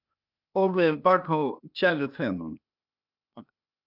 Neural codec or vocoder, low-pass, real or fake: codec, 16 kHz, 0.8 kbps, ZipCodec; 5.4 kHz; fake